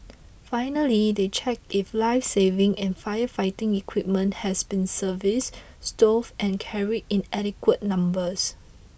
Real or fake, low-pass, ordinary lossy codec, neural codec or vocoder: real; none; none; none